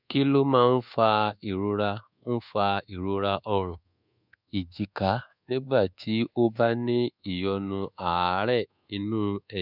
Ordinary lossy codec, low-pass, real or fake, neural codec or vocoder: none; 5.4 kHz; fake; codec, 24 kHz, 1.2 kbps, DualCodec